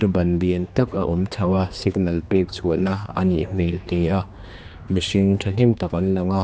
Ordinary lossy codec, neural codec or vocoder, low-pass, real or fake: none; codec, 16 kHz, 2 kbps, X-Codec, HuBERT features, trained on general audio; none; fake